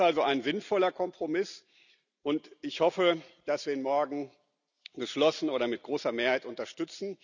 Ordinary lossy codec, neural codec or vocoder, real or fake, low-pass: none; none; real; 7.2 kHz